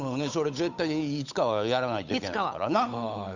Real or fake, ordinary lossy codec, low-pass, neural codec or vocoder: fake; none; 7.2 kHz; codec, 16 kHz, 8 kbps, FunCodec, trained on Chinese and English, 25 frames a second